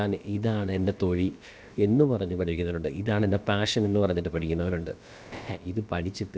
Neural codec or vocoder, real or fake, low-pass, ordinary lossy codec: codec, 16 kHz, about 1 kbps, DyCAST, with the encoder's durations; fake; none; none